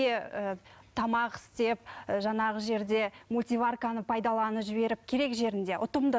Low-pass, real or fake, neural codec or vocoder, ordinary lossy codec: none; real; none; none